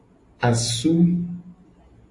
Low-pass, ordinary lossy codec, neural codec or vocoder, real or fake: 10.8 kHz; AAC, 48 kbps; none; real